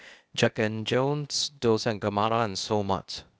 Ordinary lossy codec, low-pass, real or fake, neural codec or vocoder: none; none; fake; codec, 16 kHz, 0.8 kbps, ZipCodec